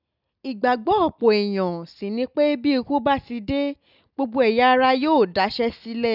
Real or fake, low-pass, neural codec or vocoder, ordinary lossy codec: real; 5.4 kHz; none; none